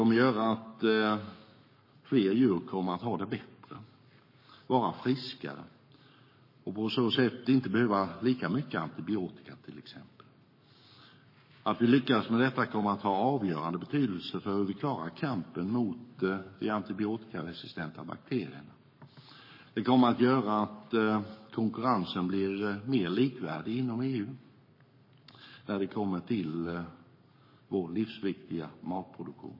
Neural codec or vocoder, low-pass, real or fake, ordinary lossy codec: codec, 44.1 kHz, 7.8 kbps, Pupu-Codec; 5.4 kHz; fake; MP3, 24 kbps